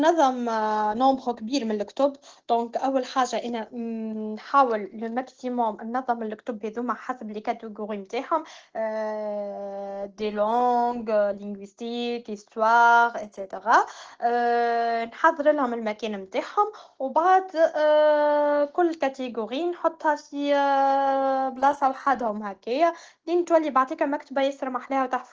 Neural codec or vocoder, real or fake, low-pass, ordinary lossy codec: none; real; 7.2 kHz; Opus, 16 kbps